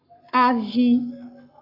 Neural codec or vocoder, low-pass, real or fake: codec, 44.1 kHz, 7.8 kbps, Pupu-Codec; 5.4 kHz; fake